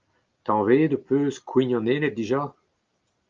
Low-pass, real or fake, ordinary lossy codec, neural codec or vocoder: 7.2 kHz; real; Opus, 24 kbps; none